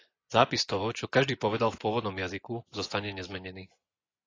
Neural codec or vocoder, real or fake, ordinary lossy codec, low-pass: vocoder, 24 kHz, 100 mel bands, Vocos; fake; AAC, 32 kbps; 7.2 kHz